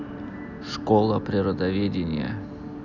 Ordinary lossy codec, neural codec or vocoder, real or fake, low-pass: none; none; real; 7.2 kHz